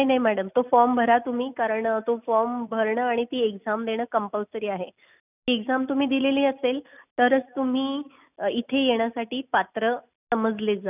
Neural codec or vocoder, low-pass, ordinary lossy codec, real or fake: none; 3.6 kHz; none; real